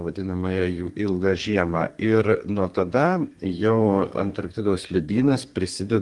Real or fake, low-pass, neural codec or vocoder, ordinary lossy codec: fake; 10.8 kHz; codec, 32 kHz, 1.9 kbps, SNAC; Opus, 24 kbps